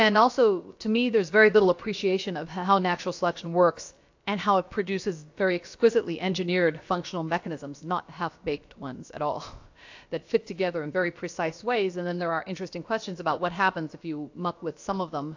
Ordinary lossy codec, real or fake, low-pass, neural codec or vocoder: AAC, 48 kbps; fake; 7.2 kHz; codec, 16 kHz, about 1 kbps, DyCAST, with the encoder's durations